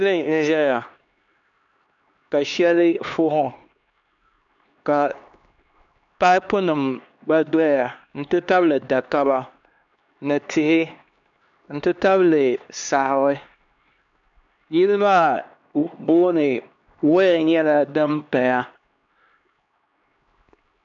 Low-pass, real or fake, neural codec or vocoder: 7.2 kHz; fake; codec, 16 kHz, 2 kbps, X-Codec, HuBERT features, trained on LibriSpeech